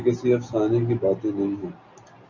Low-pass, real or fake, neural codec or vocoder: 7.2 kHz; real; none